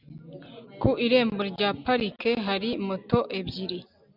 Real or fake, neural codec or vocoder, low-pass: real; none; 5.4 kHz